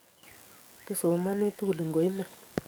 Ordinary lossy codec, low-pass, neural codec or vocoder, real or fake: none; none; codec, 44.1 kHz, 7.8 kbps, DAC; fake